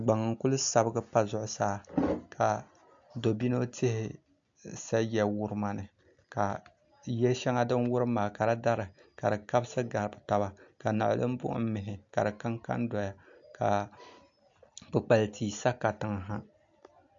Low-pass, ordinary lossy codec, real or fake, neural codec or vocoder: 7.2 kHz; AAC, 64 kbps; real; none